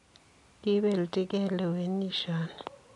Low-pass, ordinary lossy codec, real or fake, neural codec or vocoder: 10.8 kHz; none; real; none